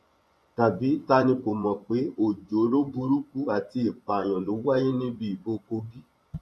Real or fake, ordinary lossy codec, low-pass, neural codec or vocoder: fake; none; none; vocoder, 24 kHz, 100 mel bands, Vocos